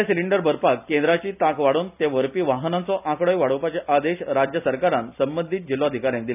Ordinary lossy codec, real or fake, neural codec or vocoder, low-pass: none; real; none; 3.6 kHz